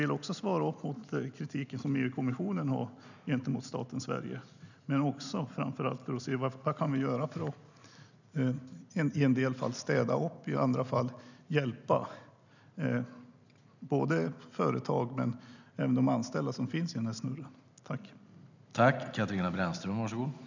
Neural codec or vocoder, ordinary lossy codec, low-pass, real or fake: none; none; 7.2 kHz; real